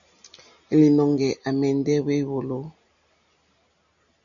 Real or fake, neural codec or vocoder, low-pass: real; none; 7.2 kHz